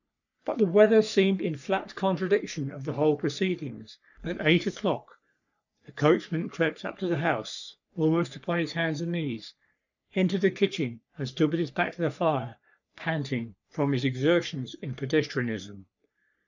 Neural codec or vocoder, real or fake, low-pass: codec, 44.1 kHz, 3.4 kbps, Pupu-Codec; fake; 7.2 kHz